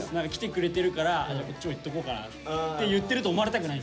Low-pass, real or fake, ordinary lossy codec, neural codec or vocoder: none; real; none; none